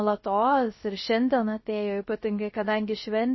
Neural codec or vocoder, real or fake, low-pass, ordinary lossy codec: codec, 16 kHz, 0.3 kbps, FocalCodec; fake; 7.2 kHz; MP3, 24 kbps